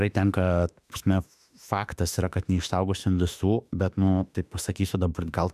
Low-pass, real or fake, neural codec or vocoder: 14.4 kHz; fake; autoencoder, 48 kHz, 32 numbers a frame, DAC-VAE, trained on Japanese speech